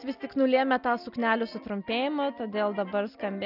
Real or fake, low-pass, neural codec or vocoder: real; 5.4 kHz; none